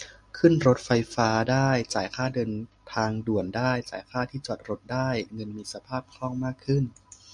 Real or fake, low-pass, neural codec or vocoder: real; 10.8 kHz; none